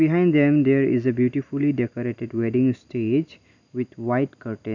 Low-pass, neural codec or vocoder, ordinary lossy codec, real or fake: 7.2 kHz; none; none; real